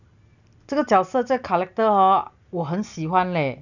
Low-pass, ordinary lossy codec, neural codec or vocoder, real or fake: 7.2 kHz; none; none; real